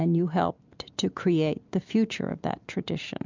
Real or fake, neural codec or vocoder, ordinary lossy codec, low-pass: real; none; MP3, 64 kbps; 7.2 kHz